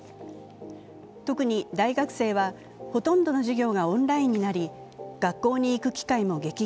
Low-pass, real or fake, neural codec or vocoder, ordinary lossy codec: none; real; none; none